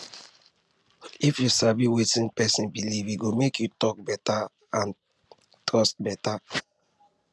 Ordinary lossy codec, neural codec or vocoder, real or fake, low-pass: none; none; real; none